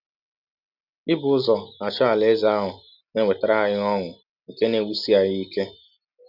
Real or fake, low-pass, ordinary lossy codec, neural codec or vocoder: real; 5.4 kHz; none; none